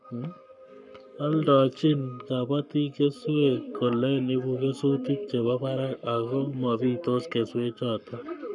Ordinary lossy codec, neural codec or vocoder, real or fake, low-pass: none; codec, 44.1 kHz, 7.8 kbps, Pupu-Codec; fake; 10.8 kHz